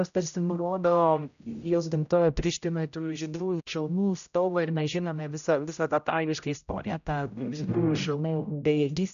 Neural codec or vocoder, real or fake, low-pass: codec, 16 kHz, 0.5 kbps, X-Codec, HuBERT features, trained on general audio; fake; 7.2 kHz